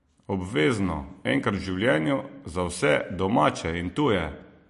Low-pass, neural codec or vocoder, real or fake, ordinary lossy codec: 14.4 kHz; none; real; MP3, 48 kbps